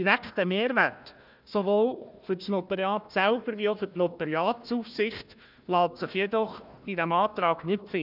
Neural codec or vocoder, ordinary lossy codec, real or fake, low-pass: codec, 16 kHz, 1 kbps, FunCodec, trained on Chinese and English, 50 frames a second; none; fake; 5.4 kHz